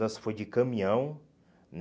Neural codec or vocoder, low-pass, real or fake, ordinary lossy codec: none; none; real; none